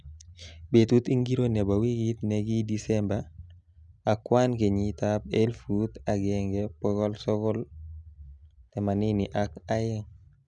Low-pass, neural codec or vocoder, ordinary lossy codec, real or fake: 10.8 kHz; none; none; real